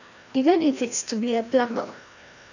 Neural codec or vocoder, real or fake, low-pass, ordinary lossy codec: codec, 16 kHz, 1 kbps, FreqCodec, larger model; fake; 7.2 kHz; none